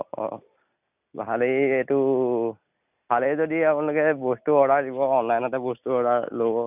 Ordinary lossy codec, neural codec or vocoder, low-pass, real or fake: none; none; 3.6 kHz; real